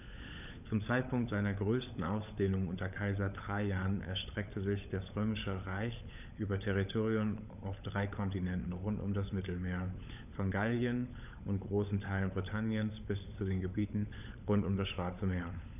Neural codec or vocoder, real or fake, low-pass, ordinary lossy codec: codec, 16 kHz, 16 kbps, FunCodec, trained on LibriTTS, 50 frames a second; fake; 3.6 kHz; none